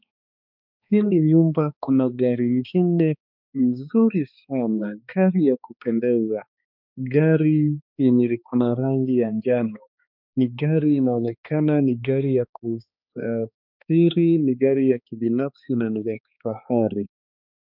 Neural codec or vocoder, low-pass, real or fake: codec, 16 kHz, 2 kbps, X-Codec, HuBERT features, trained on balanced general audio; 5.4 kHz; fake